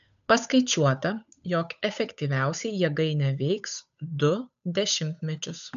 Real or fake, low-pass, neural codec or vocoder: fake; 7.2 kHz; codec, 16 kHz, 8 kbps, FunCodec, trained on Chinese and English, 25 frames a second